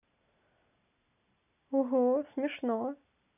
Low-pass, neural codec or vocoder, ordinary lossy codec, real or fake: 3.6 kHz; none; none; real